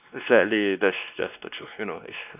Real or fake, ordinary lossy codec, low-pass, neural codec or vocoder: fake; none; 3.6 kHz; codec, 16 kHz, 2 kbps, X-Codec, WavLM features, trained on Multilingual LibriSpeech